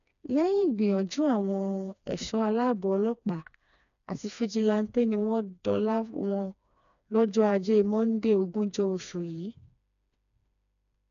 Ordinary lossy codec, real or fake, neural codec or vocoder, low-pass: none; fake; codec, 16 kHz, 2 kbps, FreqCodec, smaller model; 7.2 kHz